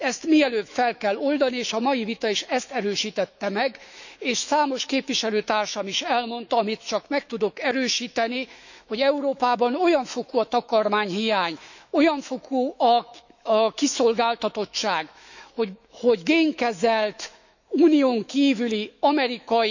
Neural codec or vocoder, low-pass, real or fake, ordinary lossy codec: autoencoder, 48 kHz, 128 numbers a frame, DAC-VAE, trained on Japanese speech; 7.2 kHz; fake; none